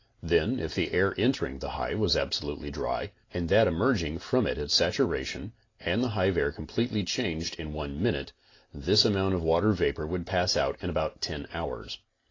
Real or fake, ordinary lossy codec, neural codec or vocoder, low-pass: real; AAC, 32 kbps; none; 7.2 kHz